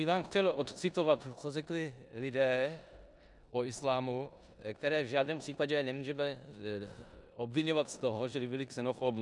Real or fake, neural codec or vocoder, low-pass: fake; codec, 16 kHz in and 24 kHz out, 0.9 kbps, LongCat-Audio-Codec, four codebook decoder; 10.8 kHz